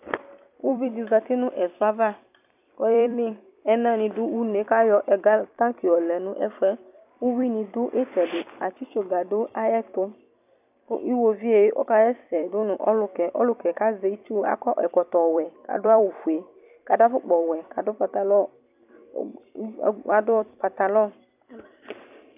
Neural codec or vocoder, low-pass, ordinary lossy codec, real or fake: vocoder, 44.1 kHz, 128 mel bands every 256 samples, BigVGAN v2; 3.6 kHz; AAC, 32 kbps; fake